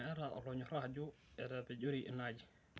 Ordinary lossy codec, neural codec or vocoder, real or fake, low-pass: none; none; real; none